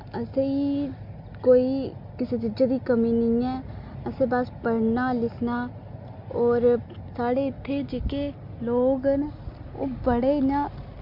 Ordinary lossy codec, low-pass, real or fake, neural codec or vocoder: MP3, 48 kbps; 5.4 kHz; real; none